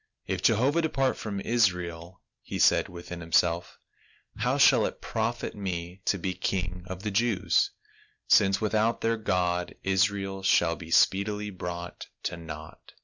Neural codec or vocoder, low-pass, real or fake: none; 7.2 kHz; real